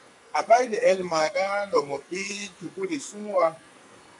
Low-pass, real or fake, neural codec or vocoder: 10.8 kHz; fake; codec, 44.1 kHz, 2.6 kbps, SNAC